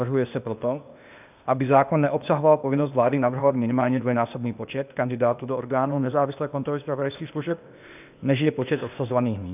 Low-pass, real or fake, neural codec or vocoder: 3.6 kHz; fake; codec, 16 kHz, 0.8 kbps, ZipCodec